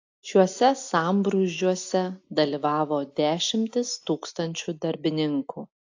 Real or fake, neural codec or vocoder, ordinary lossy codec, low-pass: real; none; AAC, 48 kbps; 7.2 kHz